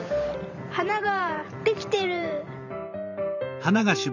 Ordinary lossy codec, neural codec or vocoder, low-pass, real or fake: none; vocoder, 44.1 kHz, 128 mel bands every 512 samples, BigVGAN v2; 7.2 kHz; fake